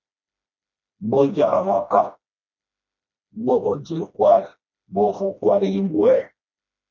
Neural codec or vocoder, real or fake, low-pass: codec, 16 kHz, 1 kbps, FreqCodec, smaller model; fake; 7.2 kHz